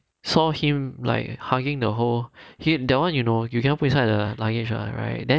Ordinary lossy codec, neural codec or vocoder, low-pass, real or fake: none; none; none; real